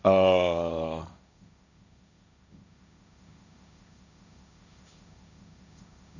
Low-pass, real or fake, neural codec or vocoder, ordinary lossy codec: 7.2 kHz; fake; codec, 16 kHz, 1.1 kbps, Voila-Tokenizer; none